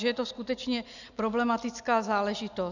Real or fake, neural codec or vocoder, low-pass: real; none; 7.2 kHz